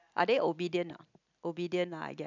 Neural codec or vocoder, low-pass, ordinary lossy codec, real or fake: none; 7.2 kHz; none; real